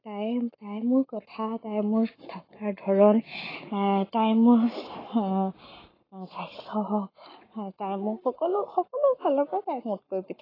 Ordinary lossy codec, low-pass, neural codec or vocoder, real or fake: AAC, 24 kbps; 5.4 kHz; codec, 44.1 kHz, 7.8 kbps, Pupu-Codec; fake